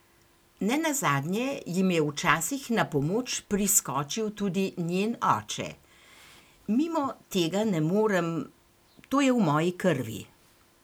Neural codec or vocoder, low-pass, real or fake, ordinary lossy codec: none; none; real; none